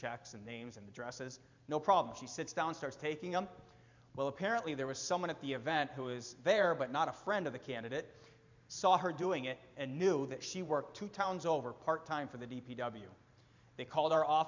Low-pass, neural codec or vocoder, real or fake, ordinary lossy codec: 7.2 kHz; vocoder, 44.1 kHz, 128 mel bands every 512 samples, BigVGAN v2; fake; MP3, 64 kbps